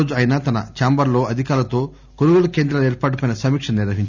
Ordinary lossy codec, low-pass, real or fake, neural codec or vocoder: none; 7.2 kHz; real; none